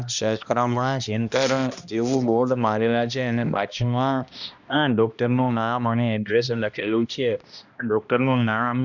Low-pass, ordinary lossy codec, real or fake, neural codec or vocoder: 7.2 kHz; none; fake; codec, 16 kHz, 1 kbps, X-Codec, HuBERT features, trained on balanced general audio